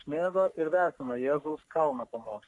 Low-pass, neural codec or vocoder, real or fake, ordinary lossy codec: 10.8 kHz; codec, 44.1 kHz, 3.4 kbps, Pupu-Codec; fake; AAC, 64 kbps